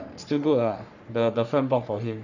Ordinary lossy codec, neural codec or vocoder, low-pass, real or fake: none; codec, 44.1 kHz, 3.4 kbps, Pupu-Codec; 7.2 kHz; fake